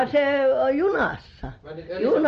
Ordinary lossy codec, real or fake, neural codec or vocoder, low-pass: Opus, 24 kbps; real; none; 7.2 kHz